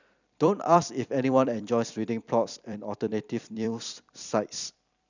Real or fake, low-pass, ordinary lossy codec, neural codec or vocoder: fake; 7.2 kHz; none; vocoder, 44.1 kHz, 128 mel bands every 256 samples, BigVGAN v2